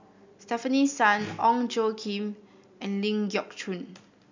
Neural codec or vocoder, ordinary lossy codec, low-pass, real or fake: none; MP3, 64 kbps; 7.2 kHz; real